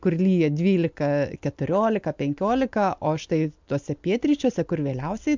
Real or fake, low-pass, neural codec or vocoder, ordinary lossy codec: real; 7.2 kHz; none; MP3, 64 kbps